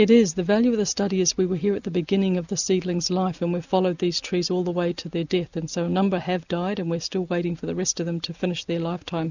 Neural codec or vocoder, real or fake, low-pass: none; real; 7.2 kHz